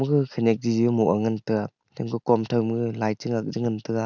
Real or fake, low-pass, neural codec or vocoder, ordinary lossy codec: real; 7.2 kHz; none; none